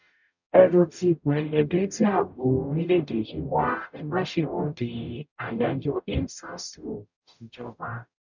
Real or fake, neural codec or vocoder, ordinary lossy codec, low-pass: fake; codec, 44.1 kHz, 0.9 kbps, DAC; none; 7.2 kHz